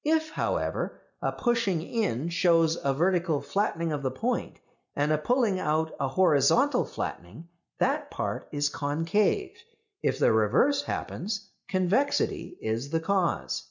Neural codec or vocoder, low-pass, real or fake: none; 7.2 kHz; real